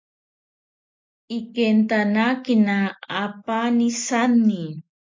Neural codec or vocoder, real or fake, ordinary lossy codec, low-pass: none; real; MP3, 48 kbps; 7.2 kHz